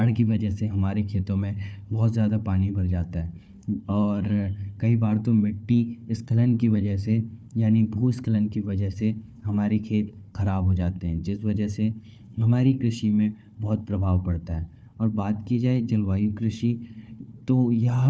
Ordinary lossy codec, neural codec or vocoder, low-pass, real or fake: none; codec, 16 kHz, 4 kbps, FunCodec, trained on Chinese and English, 50 frames a second; none; fake